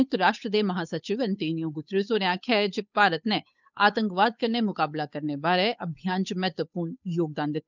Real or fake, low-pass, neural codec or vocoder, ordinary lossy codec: fake; 7.2 kHz; codec, 16 kHz, 8 kbps, FunCodec, trained on Chinese and English, 25 frames a second; none